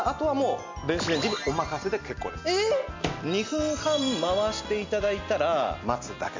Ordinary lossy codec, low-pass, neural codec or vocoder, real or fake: MP3, 64 kbps; 7.2 kHz; none; real